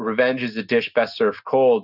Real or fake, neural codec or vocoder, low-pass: real; none; 5.4 kHz